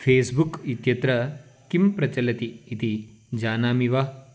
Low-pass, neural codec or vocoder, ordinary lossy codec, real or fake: none; none; none; real